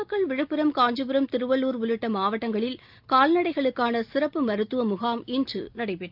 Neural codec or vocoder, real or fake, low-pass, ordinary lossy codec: none; real; 5.4 kHz; Opus, 24 kbps